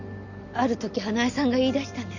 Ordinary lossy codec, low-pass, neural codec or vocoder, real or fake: none; 7.2 kHz; none; real